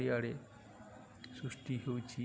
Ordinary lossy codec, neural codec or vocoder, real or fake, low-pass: none; none; real; none